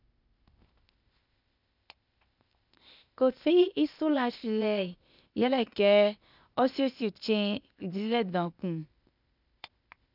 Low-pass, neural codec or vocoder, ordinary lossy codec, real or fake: 5.4 kHz; codec, 16 kHz, 0.8 kbps, ZipCodec; none; fake